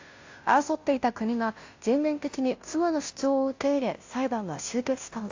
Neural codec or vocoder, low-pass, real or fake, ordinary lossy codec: codec, 16 kHz, 0.5 kbps, FunCodec, trained on Chinese and English, 25 frames a second; 7.2 kHz; fake; AAC, 48 kbps